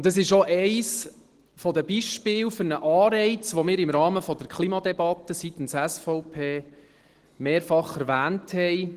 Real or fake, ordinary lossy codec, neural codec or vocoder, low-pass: real; Opus, 16 kbps; none; 14.4 kHz